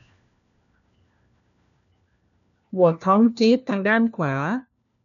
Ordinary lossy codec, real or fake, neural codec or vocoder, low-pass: none; fake; codec, 16 kHz, 1 kbps, FunCodec, trained on LibriTTS, 50 frames a second; 7.2 kHz